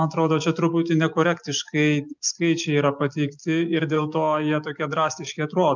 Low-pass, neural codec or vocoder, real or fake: 7.2 kHz; none; real